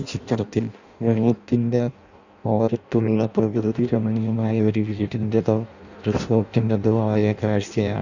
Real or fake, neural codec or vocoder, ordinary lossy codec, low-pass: fake; codec, 16 kHz in and 24 kHz out, 0.6 kbps, FireRedTTS-2 codec; none; 7.2 kHz